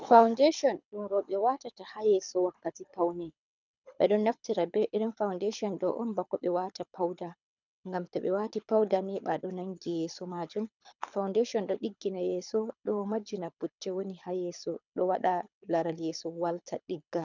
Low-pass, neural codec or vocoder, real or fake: 7.2 kHz; codec, 24 kHz, 6 kbps, HILCodec; fake